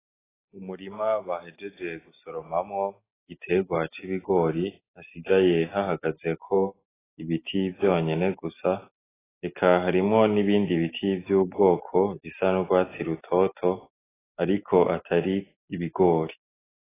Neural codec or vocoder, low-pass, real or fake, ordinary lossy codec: none; 3.6 kHz; real; AAC, 16 kbps